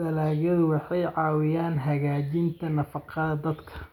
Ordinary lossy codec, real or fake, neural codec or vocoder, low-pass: none; real; none; 19.8 kHz